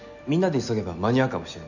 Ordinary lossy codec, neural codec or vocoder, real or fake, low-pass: none; none; real; 7.2 kHz